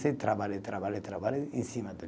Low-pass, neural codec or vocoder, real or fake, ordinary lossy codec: none; none; real; none